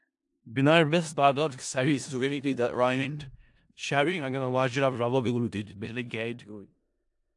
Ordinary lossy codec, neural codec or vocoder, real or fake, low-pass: AAC, 64 kbps; codec, 16 kHz in and 24 kHz out, 0.4 kbps, LongCat-Audio-Codec, four codebook decoder; fake; 10.8 kHz